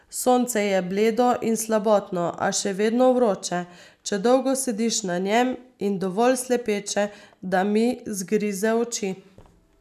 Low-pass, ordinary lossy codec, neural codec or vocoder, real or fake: 14.4 kHz; none; none; real